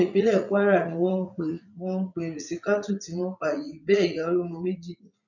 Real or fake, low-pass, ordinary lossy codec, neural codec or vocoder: fake; 7.2 kHz; none; vocoder, 44.1 kHz, 80 mel bands, Vocos